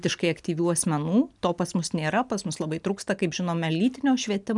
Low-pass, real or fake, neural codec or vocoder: 10.8 kHz; real; none